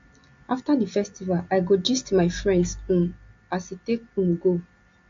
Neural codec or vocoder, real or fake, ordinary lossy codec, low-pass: none; real; AAC, 48 kbps; 7.2 kHz